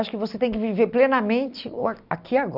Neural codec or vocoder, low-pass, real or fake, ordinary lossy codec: none; 5.4 kHz; real; none